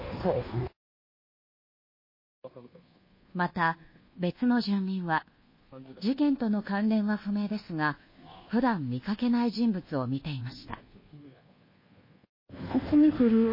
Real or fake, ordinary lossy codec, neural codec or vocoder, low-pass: fake; MP3, 24 kbps; codec, 24 kHz, 1.2 kbps, DualCodec; 5.4 kHz